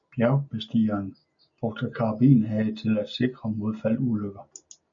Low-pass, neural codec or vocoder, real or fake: 7.2 kHz; none; real